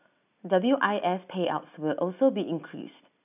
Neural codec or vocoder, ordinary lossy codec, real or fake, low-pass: none; none; real; 3.6 kHz